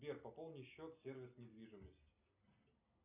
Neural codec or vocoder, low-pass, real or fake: none; 3.6 kHz; real